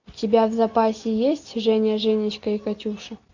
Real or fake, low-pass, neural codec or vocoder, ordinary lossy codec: real; 7.2 kHz; none; AAC, 48 kbps